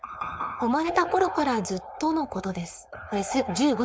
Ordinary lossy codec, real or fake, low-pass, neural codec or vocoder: none; fake; none; codec, 16 kHz, 4.8 kbps, FACodec